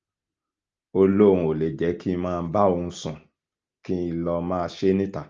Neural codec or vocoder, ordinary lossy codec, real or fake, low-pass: none; Opus, 24 kbps; real; 7.2 kHz